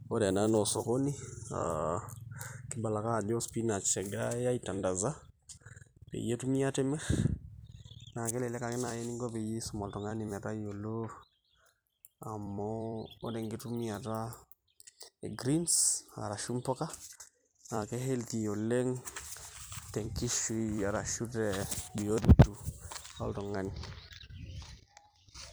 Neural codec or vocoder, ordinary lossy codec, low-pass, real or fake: vocoder, 44.1 kHz, 128 mel bands every 256 samples, BigVGAN v2; none; none; fake